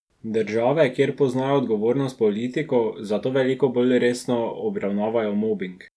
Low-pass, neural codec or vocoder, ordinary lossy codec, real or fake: none; none; none; real